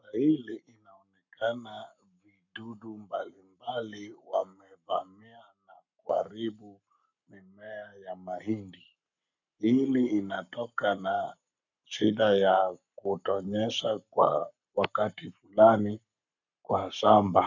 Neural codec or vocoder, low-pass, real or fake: codec, 44.1 kHz, 7.8 kbps, Pupu-Codec; 7.2 kHz; fake